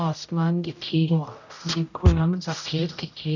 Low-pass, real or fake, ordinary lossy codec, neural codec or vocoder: 7.2 kHz; fake; none; codec, 16 kHz, 0.5 kbps, X-Codec, HuBERT features, trained on general audio